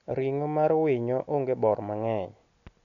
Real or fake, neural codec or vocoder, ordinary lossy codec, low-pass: real; none; none; 7.2 kHz